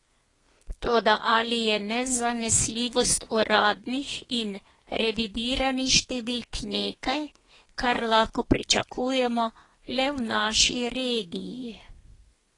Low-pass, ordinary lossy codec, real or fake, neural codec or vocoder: 10.8 kHz; AAC, 32 kbps; fake; codec, 32 kHz, 1.9 kbps, SNAC